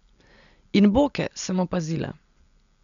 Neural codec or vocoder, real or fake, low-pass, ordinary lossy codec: none; real; 7.2 kHz; none